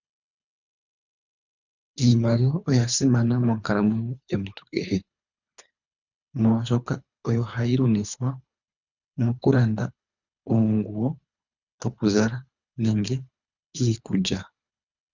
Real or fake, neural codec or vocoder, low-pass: fake; codec, 24 kHz, 3 kbps, HILCodec; 7.2 kHz